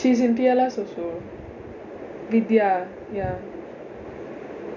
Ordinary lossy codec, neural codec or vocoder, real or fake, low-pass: none; none; real; 7.2 kHz